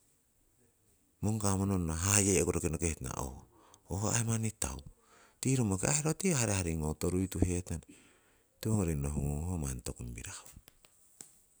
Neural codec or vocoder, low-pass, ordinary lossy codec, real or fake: none; none; none; real